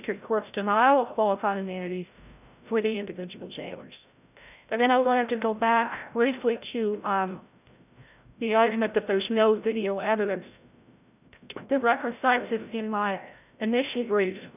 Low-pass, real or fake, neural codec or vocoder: 3.6 kHz; fake; codec, 16 kHz, 0.5 kbps, FreqCodec, larger model